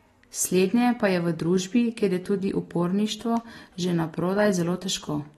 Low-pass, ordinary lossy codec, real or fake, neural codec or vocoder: 14.4 kHz; AAC, 32 kbps; real; none